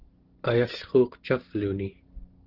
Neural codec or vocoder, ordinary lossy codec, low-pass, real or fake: none; Opus, 16 kbps; 5.4 kHz; real